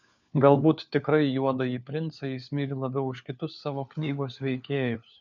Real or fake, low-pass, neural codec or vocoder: fake; 7.2 kHz; codec, 16 kHz, 4 kbps, FunCodec, trained on LibriTTS, 50 frames a second